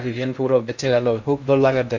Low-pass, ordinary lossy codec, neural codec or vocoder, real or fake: 7.2 kHz; MP3, 64 kbps; codec, 16 kHz in and 24 kHz out, 0.8 kbps, FocalCodec, streaming, 65536 codes; fake